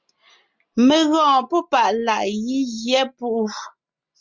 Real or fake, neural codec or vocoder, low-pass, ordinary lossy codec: real; none; 7.2 kHz; Opus, 64 kbps